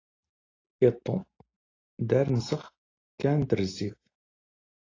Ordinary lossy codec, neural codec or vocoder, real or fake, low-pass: AAC, 32 kbps; none; real; 7.2 kHz